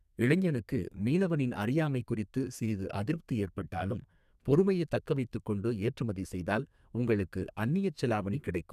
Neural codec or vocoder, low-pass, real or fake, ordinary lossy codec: codec, 32 kHz, 1.9 kbps, SNAC; 14.4 kHz; fake; none